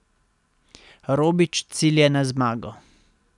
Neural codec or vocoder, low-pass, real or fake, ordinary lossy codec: none; 10.8 kHz; real; none